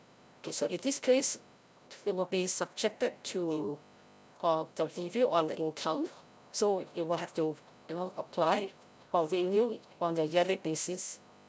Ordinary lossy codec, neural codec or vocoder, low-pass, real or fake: none; codec, 16 kHz, 0.5 kbps, FreqCodec, larger model; none; fake